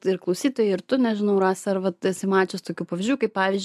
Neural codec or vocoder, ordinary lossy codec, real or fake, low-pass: none; AAC, 96 kbps; real; 14.4 kHz